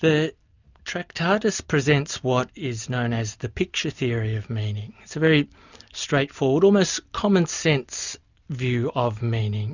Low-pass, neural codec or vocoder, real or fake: 7.2 kHz; none; real